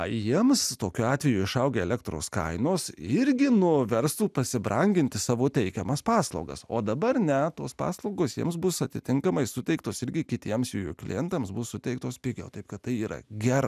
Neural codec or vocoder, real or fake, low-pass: none; real; 14.4 kHz